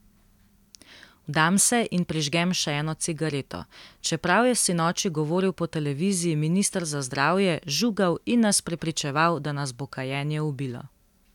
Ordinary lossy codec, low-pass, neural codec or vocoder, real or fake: none; 19.8 kHz; none; real